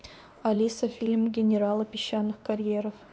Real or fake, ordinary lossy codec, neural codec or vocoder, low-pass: fake; none; codec, 16 kHz, 2 kbps, X-Codec, WavLM features, trained on Multilingual LibriSpeech; none